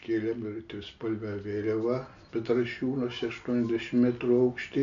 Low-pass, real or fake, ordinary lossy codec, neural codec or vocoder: 7.2 kHz; real; MP3, 96 kbps; none